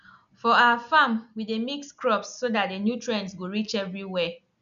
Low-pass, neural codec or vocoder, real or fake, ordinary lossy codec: 7.2 kHz; none; real; none